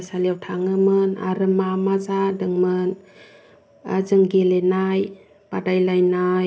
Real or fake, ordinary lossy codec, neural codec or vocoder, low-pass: real; none; none; none